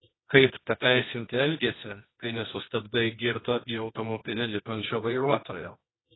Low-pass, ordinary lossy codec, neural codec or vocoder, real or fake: 7.2 kHz; AAC, 16 kbps; codec, 24 kHz, 0.9 kbps, WavTokenizer, medium music audio release; fake